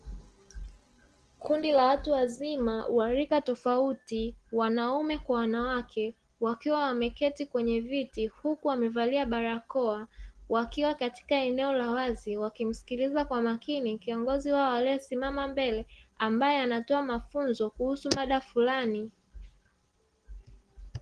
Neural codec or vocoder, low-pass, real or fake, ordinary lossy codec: none; 9.9 kHz; real; Opus, 16 kbps